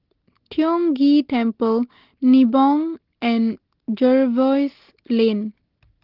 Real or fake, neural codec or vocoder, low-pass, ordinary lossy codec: real; none; 5.4 kHz; Opus, 16 kbps